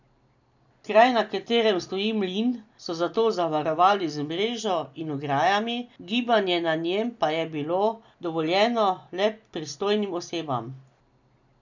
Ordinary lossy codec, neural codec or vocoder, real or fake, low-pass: none; none; real; 7.2 kHz